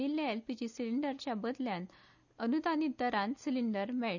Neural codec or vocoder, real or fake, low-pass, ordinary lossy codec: none; real; 7.2 kHz; none